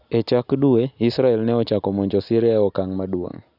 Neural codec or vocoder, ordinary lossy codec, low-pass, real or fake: none; none; 5.4 kHz; real